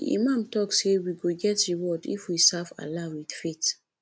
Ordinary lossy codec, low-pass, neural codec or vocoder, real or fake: none; none; none; real